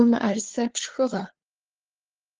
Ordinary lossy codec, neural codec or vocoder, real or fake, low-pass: Opus, 16 kbps; codec, 16 kHz, 2 kbps, FunCodec, trained on LibriTTS, 25 frames a second; fake; 7.2 kHz